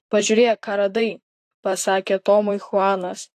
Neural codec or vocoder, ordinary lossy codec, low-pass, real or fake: vocoder, 44.1 kHz, 128 mel bands, Pupu-Vocoder; AAC, 64 kbps; 14.4 kHz; fake